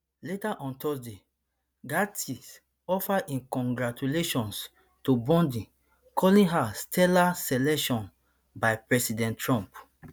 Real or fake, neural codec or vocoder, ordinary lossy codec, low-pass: real; none; none; none